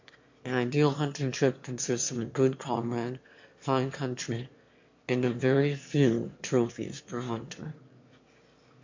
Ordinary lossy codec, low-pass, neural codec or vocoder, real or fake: MP3, 48 kbps; 7.2 kHz; autoencoder, 22.05 kHz, a latent of 192 numbers a frame, VITS, trained on one speaker; fake